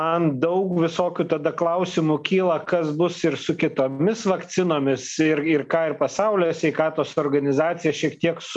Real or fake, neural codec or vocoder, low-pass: real; none; 10.8 kHz